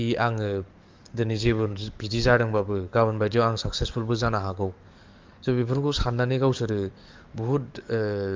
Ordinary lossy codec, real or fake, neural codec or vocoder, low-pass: Opus, 24 kbps; fake; codec, 44.1 kHz, 7.8 kbps, DAC; 7.2 kHz